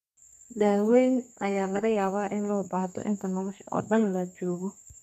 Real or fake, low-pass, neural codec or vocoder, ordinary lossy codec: fake; 14.4 kHz; codec, 32 kHz, 1.9 kbps, SNAC; none